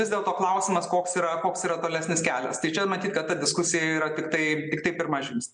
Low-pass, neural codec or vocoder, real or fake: 9.9 kHz; none; real